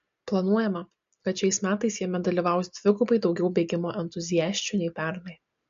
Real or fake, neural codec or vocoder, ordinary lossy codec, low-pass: real; none; MP3, 48 kbps; 7.2 kHz